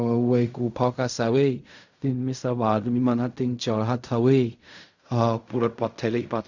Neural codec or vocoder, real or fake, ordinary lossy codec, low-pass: codec, 16 kHz in and 24 kHz out, 0.4 kbps, LongCat-Audio-Codec, fine tuned four codebook decoder; fake; none; 7.2 kHz